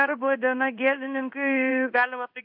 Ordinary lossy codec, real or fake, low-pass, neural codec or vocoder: AAC, 48 kbps; fake; 5.4 kHz; codec, 16 kHz in and 24 kHz out, 1 kbps, XY-Tokenizer